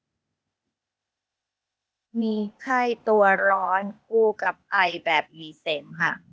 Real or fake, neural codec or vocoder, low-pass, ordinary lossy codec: fake; codec, 16 kHz, 0.8 kbps, ZipCodec; none; none